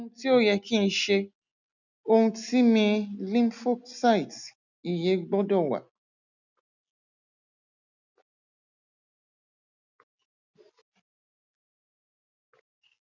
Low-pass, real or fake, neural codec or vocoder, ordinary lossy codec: 7.2 kHz; real; none; none